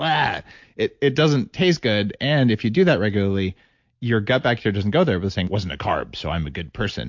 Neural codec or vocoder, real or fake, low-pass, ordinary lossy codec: none; real; 7.2 kHz; MP3, 48 kbps